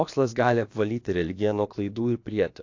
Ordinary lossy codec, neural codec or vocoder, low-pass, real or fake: AAC, 48 kbps; codec, 16 kHz, about 1 kbps, DyCAST, with the encoder's durations; 7.2 kHz; fake